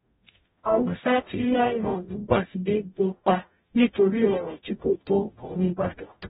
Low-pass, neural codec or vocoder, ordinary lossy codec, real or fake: 19.8 kHz; codec, 44.1 kHz, 0.9 kbps, DAC; AAC, 16 kbps; fake